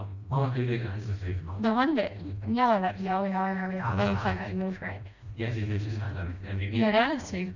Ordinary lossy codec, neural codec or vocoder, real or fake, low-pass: none; codec, 16 kHz, 1 kbps, FreqCodec, smaller model; fake; 7.2 kHz